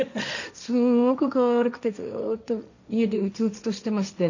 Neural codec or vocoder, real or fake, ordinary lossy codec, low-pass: codec, 16 kHz, 1.1 kbps, Voila-Tokenizer; fake; none; 7.2 kHz